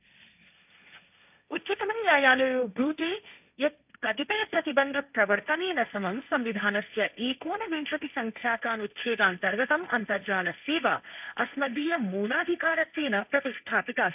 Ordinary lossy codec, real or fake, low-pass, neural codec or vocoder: none; fake; 3.6 kHz; codec, 16 kHz, 1.1 kbps, Voila-Tokenizer